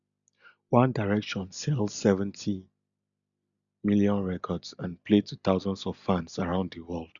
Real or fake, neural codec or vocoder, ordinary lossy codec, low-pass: real; none; none; 7.2 kHz